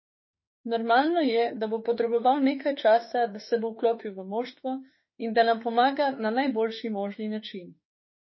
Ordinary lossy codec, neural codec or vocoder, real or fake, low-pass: MP3, 24 kbps; autoencoder, 48 kHz, 32 numbers a frame, DAC-VAE, trained on Japanese speech; fake; 7.2 kHz